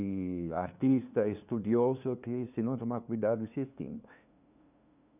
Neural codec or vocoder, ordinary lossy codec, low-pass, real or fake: codec, 16 kHz, 2 kbps, FunCodec, trained on LibriTTS, 25 frames a second; none; 3.6 kHz; fake